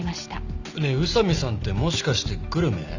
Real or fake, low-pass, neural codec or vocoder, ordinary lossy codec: real; 7.2 kHz; none; Opus, 64 kbps